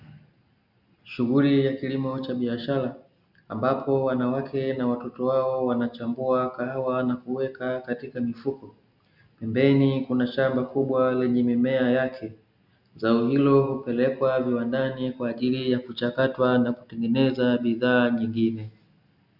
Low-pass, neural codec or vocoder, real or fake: 5.4 kHz; none; real